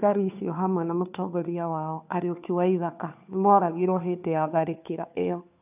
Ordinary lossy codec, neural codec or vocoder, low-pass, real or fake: none; codec, 16 kHz, 2 kbps, FunCodec, trained on Chinese and English, 25 frames a second; 3.6 kHz; fake